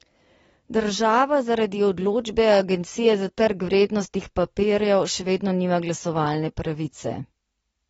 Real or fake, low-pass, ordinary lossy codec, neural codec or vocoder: real; 19.8 kHz; AAC, 24 kbps; none